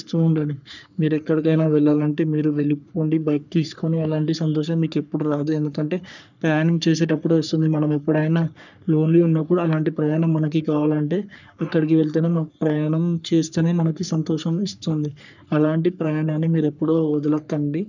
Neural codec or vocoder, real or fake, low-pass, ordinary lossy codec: codec, 44.1 kHz, 3.4 kbps, Pupu-Codec; fake; 7.2 kHz; none